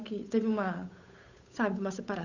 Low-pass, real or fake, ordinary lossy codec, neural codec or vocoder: 7.2 kHz; fake; Opus, 64 kbps; codec, 16 kHz, 8 kbps, FunCodec, trained on Chinese and English, 25 frames a second